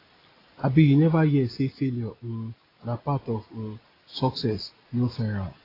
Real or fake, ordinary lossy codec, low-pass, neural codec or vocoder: real; AAC, 24 kbps; 5.4 kHz; none